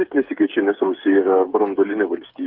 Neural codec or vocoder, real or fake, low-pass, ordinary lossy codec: codec, 16 kHz, 8 kbps, FreqCodec, smaller model; fake; 5.4 kHz; Opus, 32 kbps